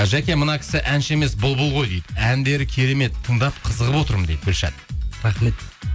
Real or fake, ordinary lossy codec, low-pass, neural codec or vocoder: real; none; none; none